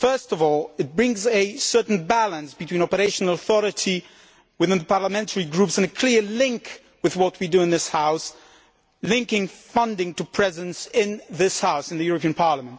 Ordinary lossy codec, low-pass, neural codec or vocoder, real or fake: none; none; none; real